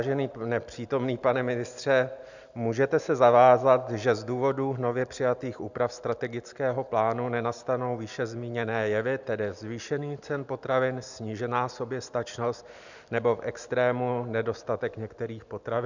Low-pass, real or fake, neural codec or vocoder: 7.2 kHz; real; none